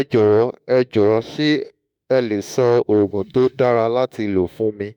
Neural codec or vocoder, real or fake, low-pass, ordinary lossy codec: autoencoder, 48 kHz, 32 numbers a frame, DAC-VAE, trained on Japanese speech; fake; 19.8 kHz; none